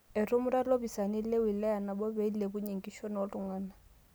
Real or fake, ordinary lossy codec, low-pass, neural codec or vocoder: real; none; none; none